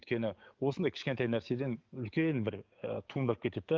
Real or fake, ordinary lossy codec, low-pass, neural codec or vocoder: fake; Opus, 32 kbps; 7.2 kHz; codec, 44.1 kHz, 7.8 kbps, DAC